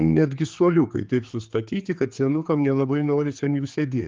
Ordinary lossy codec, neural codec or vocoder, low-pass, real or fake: Opus, 32 kbps; codec, 16 kHz, 4 kbps, X-Codec, HuBERT features, trained on general audio; 7.2 kHz; fake